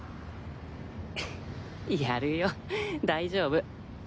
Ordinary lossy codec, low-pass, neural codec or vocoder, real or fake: none; none; none; real